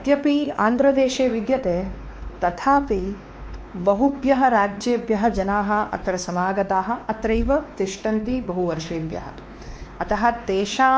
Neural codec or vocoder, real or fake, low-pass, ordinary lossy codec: codec, 16 kHz, 2 kbps, X-Codec, WavLM features, trained on Multilingual LibriSpeech; fake; none; none